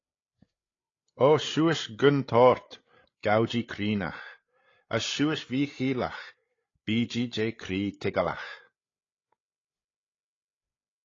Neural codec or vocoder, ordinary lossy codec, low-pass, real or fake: codec, 16 kHz, 8 kbps, FreqCodec, larger model; AAC, 32 kbps; 7.2 kHz; fake